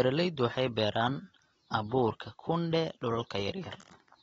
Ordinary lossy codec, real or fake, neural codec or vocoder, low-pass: AAC, 24 kbps; real; none; 9.9 kHz